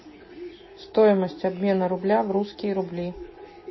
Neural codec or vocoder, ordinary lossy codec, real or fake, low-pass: none; MP3, 24 kbps; real; 7.2 kHz